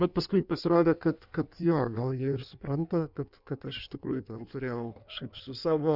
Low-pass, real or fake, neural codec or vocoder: 5.4 kHz; fake; codec, 16 kHz in and 24 kHz out, 1.1 kbps, FireRedTTS-2 codec